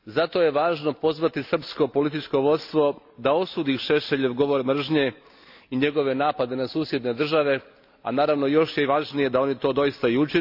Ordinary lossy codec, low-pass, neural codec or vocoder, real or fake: AAC, 48 kbps; 5.4 kHz; none; real